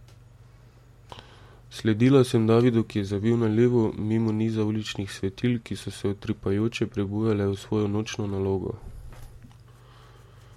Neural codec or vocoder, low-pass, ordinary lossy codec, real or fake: none; 19.8 kHz; MP3, 64 kbps; real